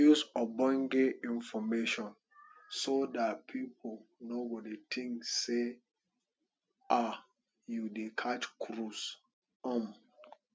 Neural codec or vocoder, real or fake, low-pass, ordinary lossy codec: none; real; none; none